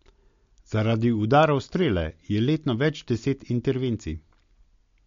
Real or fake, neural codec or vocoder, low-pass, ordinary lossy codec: real; none; 7.2 kHz; MP3, 48 kbps